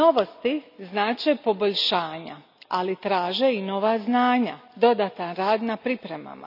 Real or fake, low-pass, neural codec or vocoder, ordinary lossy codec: real; 5.4 kHz; none; none